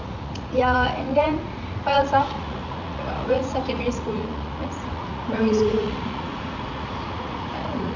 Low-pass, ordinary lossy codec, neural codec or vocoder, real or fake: 7.2 kHz; none; vocoder, 44.1 kHz, 80 mel bands, Vocos; fake